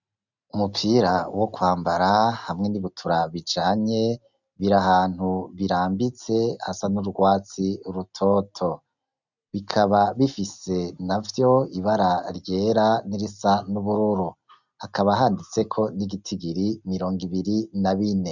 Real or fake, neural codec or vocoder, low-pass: real; none; 7.2 kHz